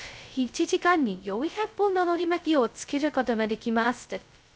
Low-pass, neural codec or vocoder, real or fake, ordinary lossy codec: none; codec, 16 kHz, 0.2 kbps, FocalCodec; fake; none